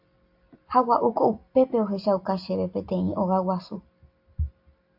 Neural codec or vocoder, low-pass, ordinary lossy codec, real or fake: none; 5.4 kHz; AAC, 48 kbps; real